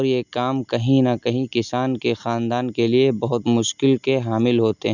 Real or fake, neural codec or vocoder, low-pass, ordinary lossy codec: real; none; 7.2 kHz; none